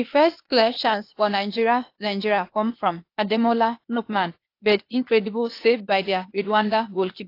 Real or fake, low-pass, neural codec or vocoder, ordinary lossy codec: fake; 5.4 kHz; codec, 16 kHz, 0.8 kbps, ZipCodec; AAC, 32 kbps